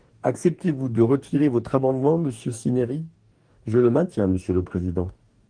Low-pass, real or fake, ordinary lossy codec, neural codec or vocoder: 9.9 kHz; fake; Opus, 24 kbps; codec, 44.1 kHz, 2.6 kbps, DAC